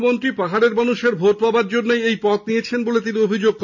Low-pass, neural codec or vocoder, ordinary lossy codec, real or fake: 7.2 kHz; none; none; real